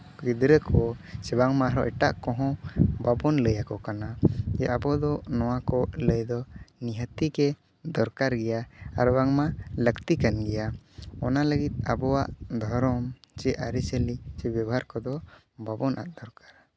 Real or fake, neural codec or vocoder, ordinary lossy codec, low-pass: real; none; none; none